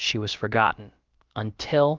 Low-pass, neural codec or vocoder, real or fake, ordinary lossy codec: 7.2 kHz; codec, 16 kHz, about 1 kbps, DyCAST, with the encoder's durations; fake; Opus, 32 kbps